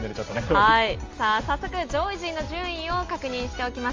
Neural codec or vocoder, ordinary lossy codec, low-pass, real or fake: none; Opus, 32 kbps; 7.2 kHz; real